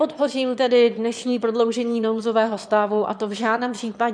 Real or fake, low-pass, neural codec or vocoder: fake; 9.9 kHz; autoencoder, 22.05 kHz, a latent of 192 numbers a frame, VITS, trained on one speaker